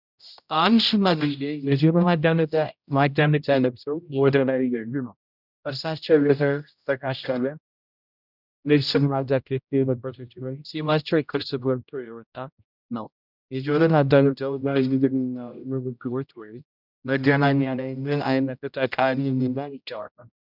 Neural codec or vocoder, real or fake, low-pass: codec, 16 kHz, 0.5 kbps, X-Codec, HuBERT features, trained on general audio; fake; 5.4 kHz